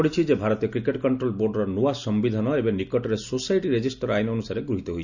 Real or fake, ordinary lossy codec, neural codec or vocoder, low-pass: real; none; none; 7.2 kHz